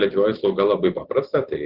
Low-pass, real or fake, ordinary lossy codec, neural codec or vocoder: 5.4 kHz; real; Opus, 16 kbps; none